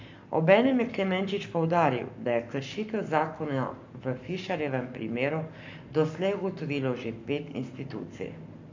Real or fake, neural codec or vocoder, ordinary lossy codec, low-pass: fake; codec, 44.1 kHz, 7.8 kbps, Pupu-Codec; MP3, 64 kbps; 7.2 kHz